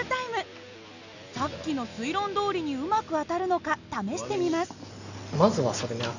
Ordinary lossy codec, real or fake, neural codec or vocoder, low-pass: none; real; none; 7.2 kHz